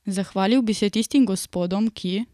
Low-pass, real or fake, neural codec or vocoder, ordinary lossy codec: 14.4 kHz; fake; vocoder, 44.1 kHz, 128 mel bands every 256 samples, BigVGAN v2; none